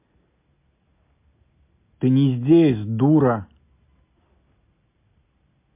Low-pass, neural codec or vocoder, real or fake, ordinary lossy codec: 3.6 kHz; none; real; MP3, 24 kbps